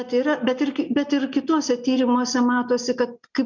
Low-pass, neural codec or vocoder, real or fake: 7.2 kHz; none; real